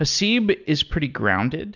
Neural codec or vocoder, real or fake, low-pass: none; real; 7.2 kHz